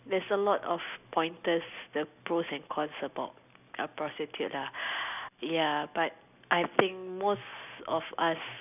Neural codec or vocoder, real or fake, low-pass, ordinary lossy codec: none; real; 3.6 kHz; none